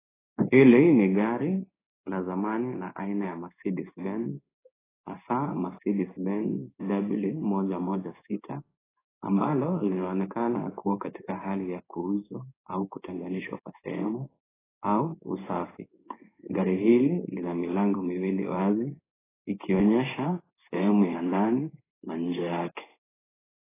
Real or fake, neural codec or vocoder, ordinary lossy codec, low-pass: fake; codec, 16 kHz in and 24 kHz out, 1 kbps, XY-Tokenizer; AAC, 16 kbps; 3.6 kHz